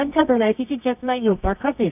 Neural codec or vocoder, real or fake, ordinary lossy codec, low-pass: codec, 24 kHz, 0.9 kbps, WavTokenizer, medium music audio release; fake; none; 3.6 kHz